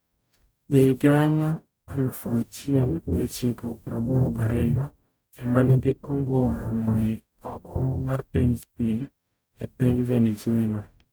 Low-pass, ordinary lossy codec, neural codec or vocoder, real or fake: none; none; codec, 44.1 kHz, 0.9 kbps, DAC; fake